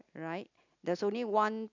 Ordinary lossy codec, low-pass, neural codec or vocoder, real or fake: none; 7.2 kHz; none; real